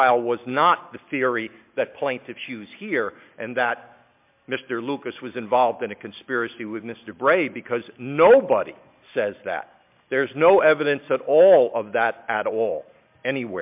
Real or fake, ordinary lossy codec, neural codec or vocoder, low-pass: real; MP3, 32 kbps; none; 3.6 kHz